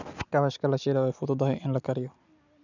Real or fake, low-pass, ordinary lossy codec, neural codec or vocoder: real; 7.2 kHz; none; none